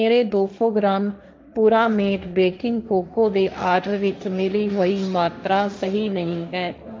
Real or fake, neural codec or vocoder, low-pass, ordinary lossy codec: fake; codec, 16 kHz, 1.1 kbps, Voila-Tokenizer; none; none